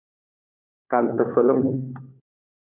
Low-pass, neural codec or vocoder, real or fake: 3.6 kHz; codec, 16 kHz, 4 kbps, FunCodec, trained on LibriTTS, 50 frames a second; fake